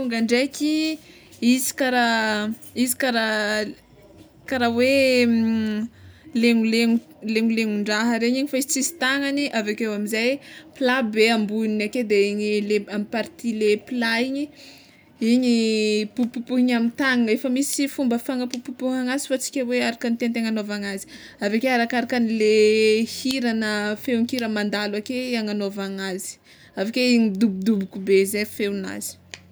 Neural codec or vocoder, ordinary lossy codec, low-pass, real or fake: none; none; none; real